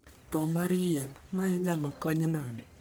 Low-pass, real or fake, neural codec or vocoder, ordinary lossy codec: none; fake; codec, 44.1 kHz, 1.7 kbps, Pupu-Codec; none